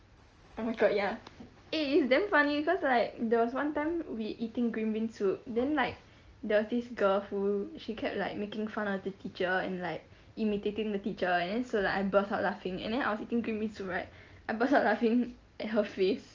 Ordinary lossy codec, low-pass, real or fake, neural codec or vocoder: Opus, 24 kbps; 7.2 kHz; real; none